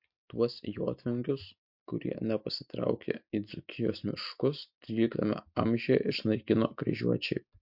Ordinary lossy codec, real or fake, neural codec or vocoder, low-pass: MP3, 48 kbps; real; none; 5.4 kHz